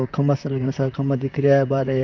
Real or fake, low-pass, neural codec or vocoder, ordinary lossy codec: fake; 7.2 kHz; vocoder, 44.1 kHz, 128 mel bands, Pupu-Vocoder; none